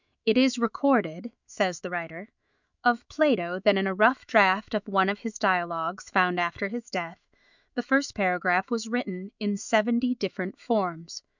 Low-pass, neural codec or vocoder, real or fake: 7.2 kHz; autoencoder, 48 kHz, 128 numbers a frame, DAC-VAE, trained on Japanese speech; fake